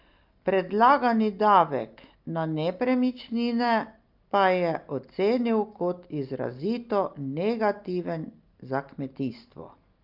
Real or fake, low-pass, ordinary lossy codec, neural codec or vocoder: real; 5.4 kHz; Opus, 32 kbps; none